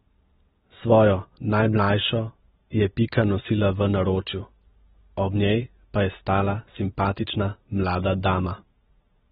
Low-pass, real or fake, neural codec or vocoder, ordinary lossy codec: 10.8 kHz; real; none; AAC, 16 kbps